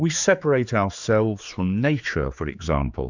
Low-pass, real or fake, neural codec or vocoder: 7.2 kHz; fake; codec, 16 kHz, 4 kbps, X-Codec, HuBERT features, trained on general audio